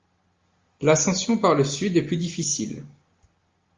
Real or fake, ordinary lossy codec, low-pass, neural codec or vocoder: real; Opus, 32 kbps; 7.2 kHz; none